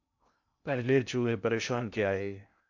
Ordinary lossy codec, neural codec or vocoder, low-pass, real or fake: AAC, 48 kbps; codec, 16 kHz in and 24 kHz out, 0.6 kbps, FocalCodec, streaming, 2048 codes; 7.2 kHz; fake